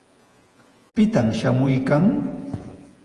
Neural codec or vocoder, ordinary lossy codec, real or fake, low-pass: vocoder, 48 kHz, 128 mel bands, Vocos; Opus, 24 kbps; fake; 10.8 kHz